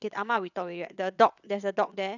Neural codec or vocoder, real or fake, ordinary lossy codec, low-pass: vocoder, 22.05 kHz, 80 mel bands, WaveNeXt; fake; none; 7.2 kHz